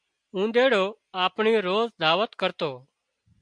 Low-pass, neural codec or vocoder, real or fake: 9.9 kHz; none; real